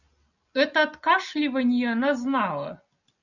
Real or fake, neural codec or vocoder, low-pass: real; none; 7.2 kHz